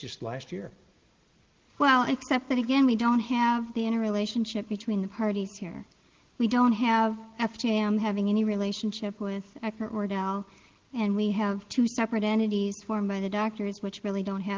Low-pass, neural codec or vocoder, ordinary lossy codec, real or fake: 7.2 kHz; none; Opus, 16 kbps; real